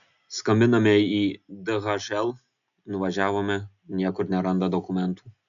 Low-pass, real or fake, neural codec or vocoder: 7.2 kHz; real; none